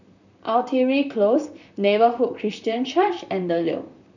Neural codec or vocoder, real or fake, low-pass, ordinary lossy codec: vocoder, 44.1 kHz, 128 mel bands, Pupu-Vocoder; fake; 7.2 kHz; none